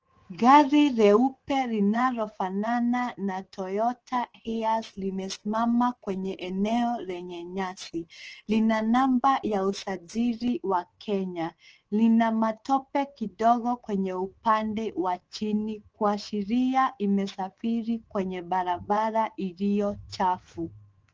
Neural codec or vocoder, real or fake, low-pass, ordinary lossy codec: codec, 24 kHz, 3.1 kbps, DualCodec; fake; 7.2 kHz; Opus, 16 kbps